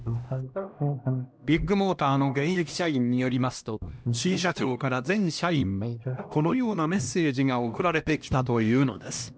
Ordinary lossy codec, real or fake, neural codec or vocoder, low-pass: none; fake; codec, 16 kHz, 1 kbps, X-Codec, HuBERT features, trained on LibriSpeech; none